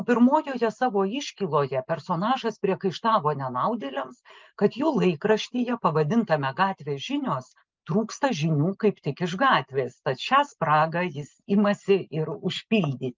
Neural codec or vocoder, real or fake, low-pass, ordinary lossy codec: none; real; 7.2 kHz; Opus, 24 kbps